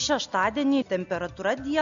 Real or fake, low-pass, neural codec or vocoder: real; 7.2 kHz; none